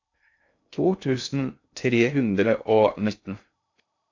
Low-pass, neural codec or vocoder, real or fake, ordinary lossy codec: 7.2 kHz; codec, 16 kHz in and 24 kHz out, 0.6 kbps, FocalCodec, streaming, 2048 codes; fake; AAC, 48 kbps